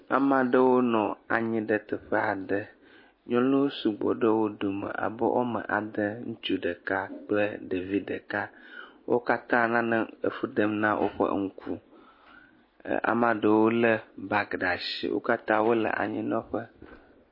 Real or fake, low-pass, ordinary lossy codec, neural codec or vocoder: real; 5.4 kHz; MP3, 24 kbps; none